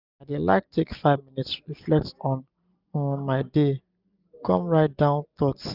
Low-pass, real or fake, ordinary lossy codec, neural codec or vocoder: 5.4 kHz; real; none; none